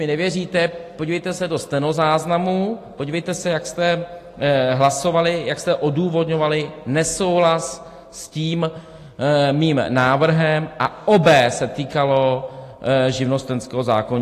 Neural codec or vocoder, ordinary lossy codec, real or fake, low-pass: none; AAC, 48 kbps; real; 14.4 kHz